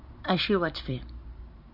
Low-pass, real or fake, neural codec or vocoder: 5.4 kHz; fake; vocoder, 44.1 kHz, 128 mel bands every 512 samples, BigVGAN v2